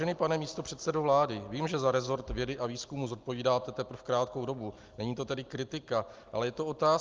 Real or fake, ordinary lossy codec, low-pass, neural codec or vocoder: real; Opus, 32 kbps; 7.2 kHz; none